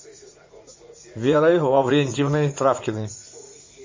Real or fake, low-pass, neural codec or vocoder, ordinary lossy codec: fake; 7.2 kHz; vocoder, 44.1 kHz, 80 mel bands, Vocos; MP3, 32 kbps